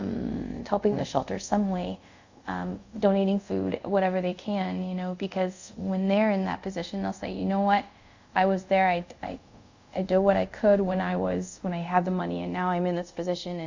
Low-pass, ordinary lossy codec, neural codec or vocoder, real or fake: 7.2 kHz; Opus, 64 kbps; codec, 24 kHz, 0.5 kbps, DualCodec; fake